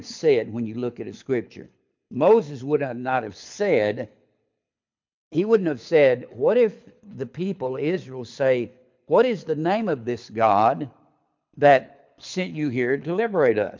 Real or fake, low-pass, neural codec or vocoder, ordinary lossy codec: fake; 7.2 kHz; codec, 24 kHz, 6 kbps, HILCodec; MP3, 64 kbps